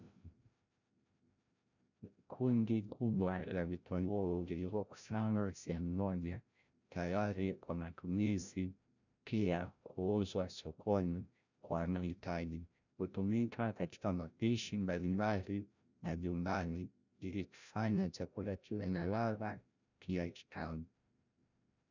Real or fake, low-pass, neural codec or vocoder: fake; 7.2 kHz; codec, 16 kHz, 0.5 kbps, FreqCodec, larger model